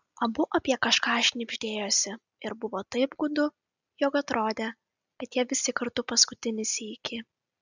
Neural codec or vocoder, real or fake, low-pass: none; real; 7.2 kHz